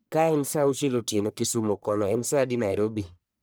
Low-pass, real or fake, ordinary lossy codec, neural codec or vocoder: none; fake; none; codec, 44.1 kHz, 1.7 kbps, Pupu-Codec